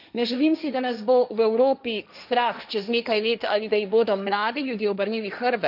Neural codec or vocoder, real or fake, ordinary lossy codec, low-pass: codec, 16 kHz, 1.1 kbps, Voila-Tokenizer; fake; none; 5.4 kHz